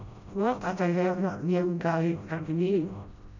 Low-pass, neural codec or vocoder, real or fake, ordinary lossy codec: 7.2 kHz; codec, 16 kHz, 0.5 kbps, FreqCodec, smaller model; fake; none